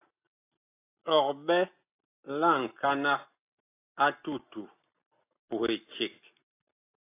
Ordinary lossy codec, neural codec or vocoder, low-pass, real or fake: AAC, 24 kbps; none; 3.6 kHz; real